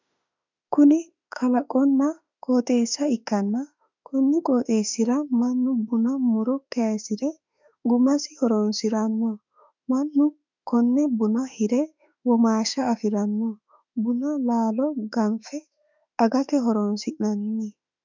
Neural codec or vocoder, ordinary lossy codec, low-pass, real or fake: autoencoder, 48 kHz, 32 numbers a frame, DAC-VAE, trained on Japanese speech; MP3, 64 kbps; 7.2 kHz; fake